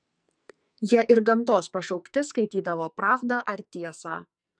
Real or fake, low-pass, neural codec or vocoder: fake; 9.9 kHz; codec, 44.1 kHz, 2.6 kbps, SNAC